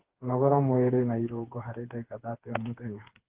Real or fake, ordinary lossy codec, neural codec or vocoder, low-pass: fake; Opus, 16 kbps; codec, 16 kHz, 4 kbps, FreqCodec, smaller model; 3.6 kHz